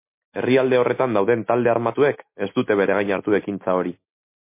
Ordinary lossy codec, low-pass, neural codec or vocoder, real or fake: MP3, 24 kbps; 3.6 kHz; none; real